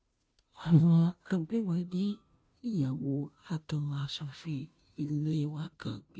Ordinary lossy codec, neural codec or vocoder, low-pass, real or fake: none; codec, 16 kHz, 0.5 kbps, FunCodec, trained on Chinese and English, 25 frames a second; none; fake